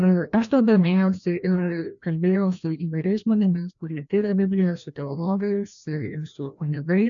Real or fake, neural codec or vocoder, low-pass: fake; codec, 16 kHz, 1 kbps, FreqCodec, larger model; 7.2 kHz